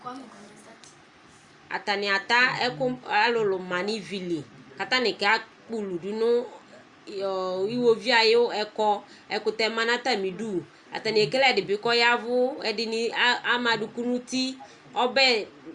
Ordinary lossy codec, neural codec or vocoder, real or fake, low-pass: Opus, 64 kbps; none; real; 10.8 kHz